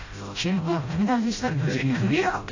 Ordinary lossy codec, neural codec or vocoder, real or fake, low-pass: AAC, 48 kbps; codec, 16 kHz, 0.5 kbps, FreqCodec, smaller model; fake; 7.2 kHz